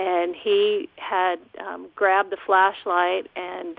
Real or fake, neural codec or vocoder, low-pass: real; none; 5.4 kHz